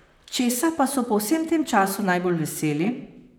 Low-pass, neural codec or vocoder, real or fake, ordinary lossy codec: none; vocoder, 44.1 kHz, 128 mel bands, Pupu-Vocoder; fake; none